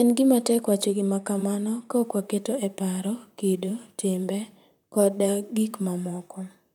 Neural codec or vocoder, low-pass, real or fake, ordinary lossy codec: vocoder, 44.1 kHz, 128 mel bands, Pupu-Vocoder; 19.8 kHz; fake; none